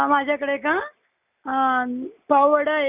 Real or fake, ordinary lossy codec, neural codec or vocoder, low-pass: real; none; none; 3.6 kHz